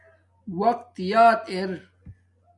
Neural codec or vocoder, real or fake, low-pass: none; real; 10.8 kHz